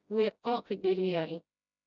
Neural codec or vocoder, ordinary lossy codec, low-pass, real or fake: codec, 16 kHz, 0.5 kbps, FreqCodec, smaller model; none; 7.2 kHz; fake